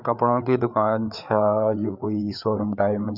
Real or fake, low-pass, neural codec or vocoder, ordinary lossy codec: fake; 5.4 kHz; codec, 16 kHz, 4 kbps, FreqCodec, larger model; none